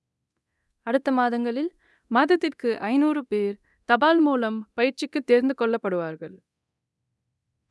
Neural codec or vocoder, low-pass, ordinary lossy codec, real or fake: codec, 24 kHz, 0.9 kbps, DualCodec; none; none; fake